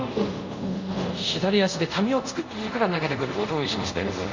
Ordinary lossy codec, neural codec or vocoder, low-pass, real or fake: MP3, 64 kbps; codec, 24 kHz, 0.5 kbps, DualCodec; 7.2 kHz; fake